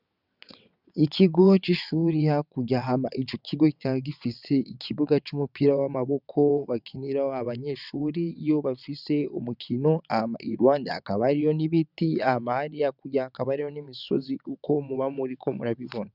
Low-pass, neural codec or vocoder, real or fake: 5.4 kHz; vocoder, 22.05 kHz, 80 mel bands, WaveNeXt; fake